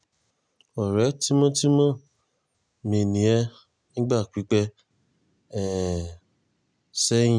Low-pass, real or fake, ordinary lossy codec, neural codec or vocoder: 9.9 kHz; real; none; none